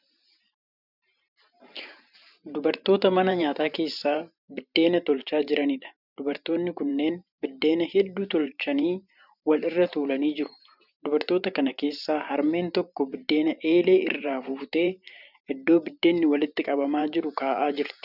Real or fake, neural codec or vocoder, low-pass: fake; vocoder, 44.1 kHz, 128 mel bands every 512 samples, BigVGAN v2; 5.4 kHz